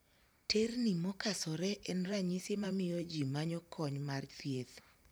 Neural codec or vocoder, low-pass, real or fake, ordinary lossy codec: vocoder, 44.1 kHz, 128 mel bands every 512 samples, BigVGAN v2; none; fake; none